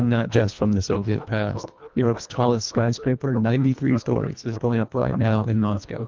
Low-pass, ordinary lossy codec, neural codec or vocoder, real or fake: 7.2 kHz; Opus, 24 kbps; codec, 24 kHz, 1.5 kbps, HILCodec; fake